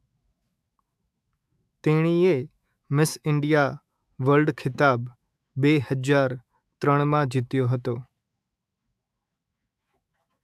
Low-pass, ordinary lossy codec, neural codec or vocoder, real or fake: 14.4 kHz; AAC, 96 kbps; autoencoder, 48 kHz, 128 numbers a frame, DAC-VAE, trained on Japanese speech; fake